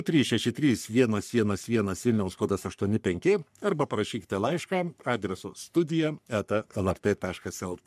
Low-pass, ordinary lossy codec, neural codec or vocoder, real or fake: 14.4 kHz; MP3, 96 kbps; codec, 44.1 kHz, 3.4 kbps, Pupu-Codec; fake